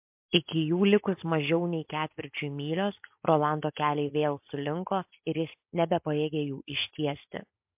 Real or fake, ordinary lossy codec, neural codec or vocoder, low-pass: real; MP3, 32 kbps; none; 3.6 kHz